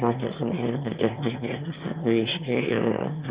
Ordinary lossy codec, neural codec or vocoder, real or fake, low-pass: Opus, 64 kbps; autoencoder, 22.05 kHz, a latent of 192 numbers a frame, VITS, trained on one speaker; fake; 3.6 kHz